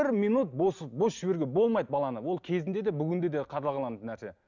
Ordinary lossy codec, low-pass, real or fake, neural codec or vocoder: none; 7.2 kHz; real; none